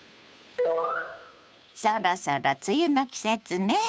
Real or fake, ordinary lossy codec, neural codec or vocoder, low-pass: fake; none; codec, 16 kHz, 2 kbps, FunCodec, trained on Chinese and English, 25 frames a second; none